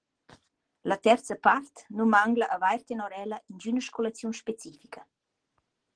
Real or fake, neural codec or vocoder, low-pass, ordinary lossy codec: real; none; 9.9 kHz; Opus, 16 kbps